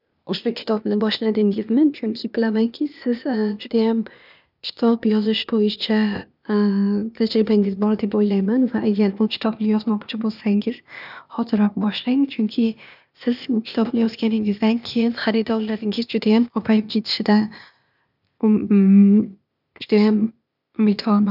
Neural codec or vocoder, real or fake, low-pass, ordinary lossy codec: codec, 16 kHz, 0.8 kbps, ZipCodec; fake; 5.4 kHz; none